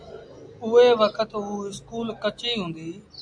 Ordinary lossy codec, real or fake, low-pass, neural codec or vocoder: AAC, 64 kbps; real; 9.9 kHz; none